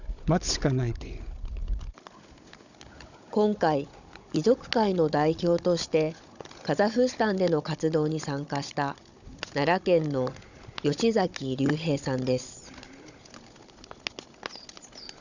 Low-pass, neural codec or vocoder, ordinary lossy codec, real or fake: 7.2 kHz; codec, 16 kHz, 16 kbps, FunCodec, trained on Chinese and English, 50 frames a second; none; fake